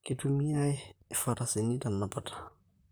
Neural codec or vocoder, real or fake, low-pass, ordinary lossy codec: vocoder, 44.1 kHz, 128 mel bands, Pupu-Vocoder; fake; none; none